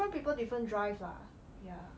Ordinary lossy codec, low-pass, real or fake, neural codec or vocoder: none; none; real; none